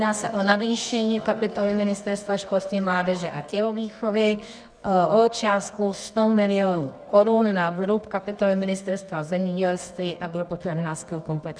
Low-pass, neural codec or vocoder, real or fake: 9.9 kHz; codec, 24 kHz, 0.9 kbps, WavTokenizer, medium music audio release; fake